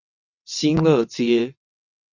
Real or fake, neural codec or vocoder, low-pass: fake; vocoder, 22.05 kHz, 80 mel bands, WaveNeXt; 7.2 kHz